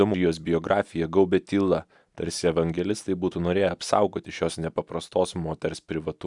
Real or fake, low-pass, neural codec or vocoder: real; 10.8 kHz; none